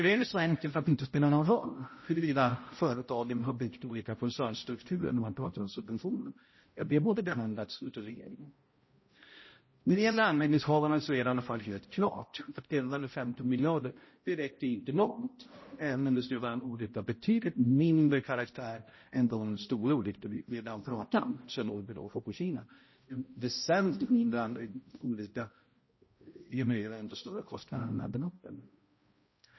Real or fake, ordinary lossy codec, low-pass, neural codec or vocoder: fake; MP3, 24 kbps; 7.2 kHz; codec, 16 kHz, 0.5 kbps, X-Codec, HuBERT features, trained on balanced general audio